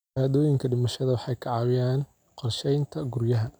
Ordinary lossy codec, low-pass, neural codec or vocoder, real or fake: none; none; none; real